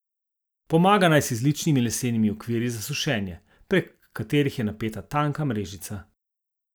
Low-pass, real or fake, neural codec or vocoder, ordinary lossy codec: none; real; none; none